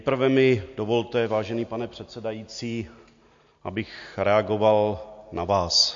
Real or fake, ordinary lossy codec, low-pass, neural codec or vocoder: real; MP3, 48 kbps; 7.2 kHz; none